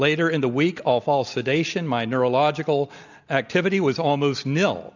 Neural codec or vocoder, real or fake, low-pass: none; real; 7.2 kHz